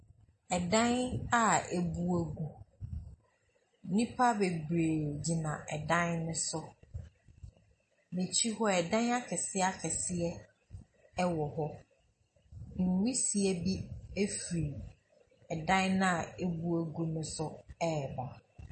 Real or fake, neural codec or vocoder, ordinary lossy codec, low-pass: real; none; MP3, 32 kbps; 10.8 kHz